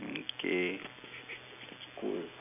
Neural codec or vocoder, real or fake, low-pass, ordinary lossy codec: none; real; 3.6 kHz; none